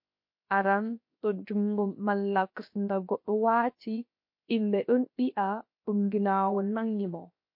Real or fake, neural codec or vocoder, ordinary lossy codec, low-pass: fake; codec, 16 kHz, 0.7 kbps, FocalCodec; MP3, 32 kbps; 5.4 kHz